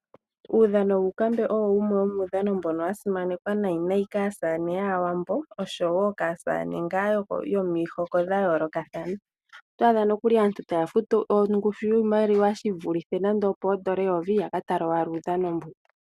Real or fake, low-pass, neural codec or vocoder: real; 14.4 kHz; none